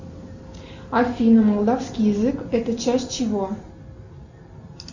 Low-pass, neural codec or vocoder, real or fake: 7.2 kHz; none; real